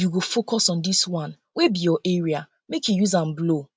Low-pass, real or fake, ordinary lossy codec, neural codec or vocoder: none; real; none; none